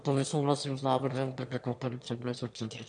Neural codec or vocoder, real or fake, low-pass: autoencoder, 22.05 kHz, a latent of 192 numbers a frame, VITS, trained on one speaker; fake; 9.9 kHz